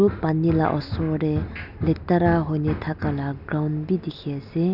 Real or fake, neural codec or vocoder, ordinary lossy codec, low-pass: real; none; none; 5.4 kHz